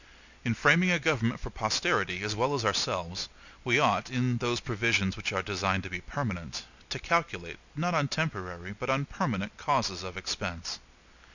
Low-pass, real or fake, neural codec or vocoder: 7.2 kHz; real; none